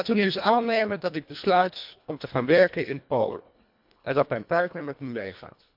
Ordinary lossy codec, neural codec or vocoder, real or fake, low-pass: MP3, 48 kbps; codec, 24 kHz, 1.5 kbps, HILCodec; fake; 5.4 kHz